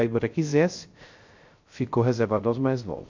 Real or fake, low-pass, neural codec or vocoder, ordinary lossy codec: fake; 7.2 kHz; codec, 16 kHz, 0.3 kbps, FocalCodec; MP3, 48 kbps